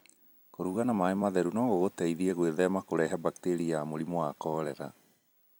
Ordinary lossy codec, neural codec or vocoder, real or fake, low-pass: none; vocoder, 44.1 kHz, 128 mel bands every 256 samples, BigVGAN v2; fake; none